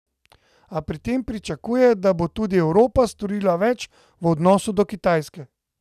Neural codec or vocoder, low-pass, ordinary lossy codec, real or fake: none; 14.4 kHz; none; real